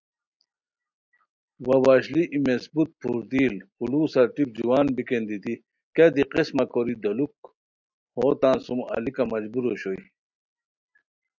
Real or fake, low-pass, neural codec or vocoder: real; 7.2 kHz; none